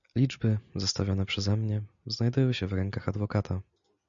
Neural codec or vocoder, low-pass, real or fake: none; 7.2 kHz; real